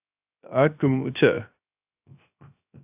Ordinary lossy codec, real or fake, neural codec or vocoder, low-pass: AAC, 32 kbps; fake; codec, 16 kHz, 0.3 kbps, FocalCodec; 3.6 kHz